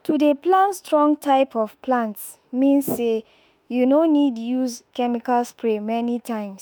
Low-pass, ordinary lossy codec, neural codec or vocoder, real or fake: none; none; autoencoder, 48 kHz, 32 numbers a frame, DAC-VAE, trained on Japanese speech; fake